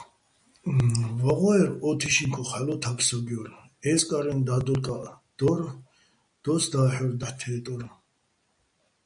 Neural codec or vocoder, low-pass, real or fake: none; 9.9 kHz; real